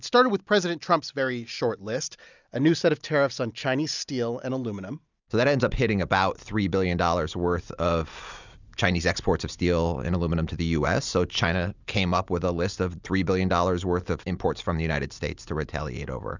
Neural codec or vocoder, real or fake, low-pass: none; real; 7.2 kHz